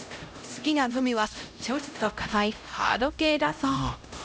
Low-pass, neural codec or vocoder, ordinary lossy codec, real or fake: none; codec, 16 kHz, 0.5 kbps, X-Codec, HuBERT features, trained on LibriSpeech; none; fake